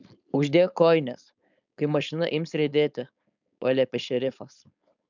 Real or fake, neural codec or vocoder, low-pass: fake; codec, 16 kHz, 4.8 kbps, FACodec; 7.2 kHz